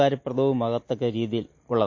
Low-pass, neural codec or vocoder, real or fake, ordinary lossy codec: 7.2 kHz; none; real; MP3, 32 kbps